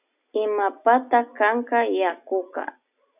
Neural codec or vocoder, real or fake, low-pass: none; real; 3.6 kHz